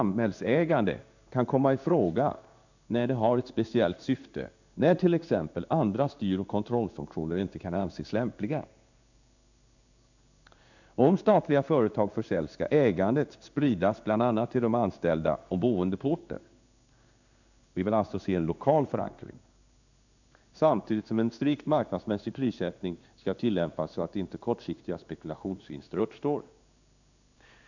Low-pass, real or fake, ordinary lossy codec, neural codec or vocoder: 7.2 kHz; fake; none; codec, 16 kHz in and 24 kHz out, 1 kbps, XY-Tokenizer